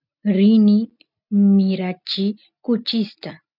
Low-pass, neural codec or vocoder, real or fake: 5.4 kHz; none; real